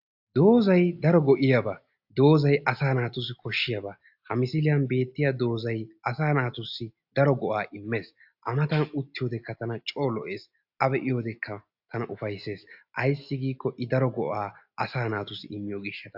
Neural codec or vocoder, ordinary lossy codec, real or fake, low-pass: none; AAC, 48 kbps; real; 5.4 kHz